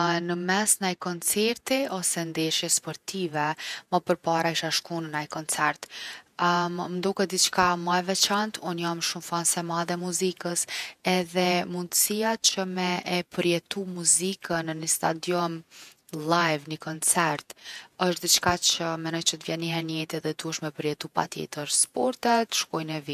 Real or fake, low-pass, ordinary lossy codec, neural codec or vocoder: fake; 14.4 kHz; none; vocoder, 48 kHz, 128 mel bands, Vocos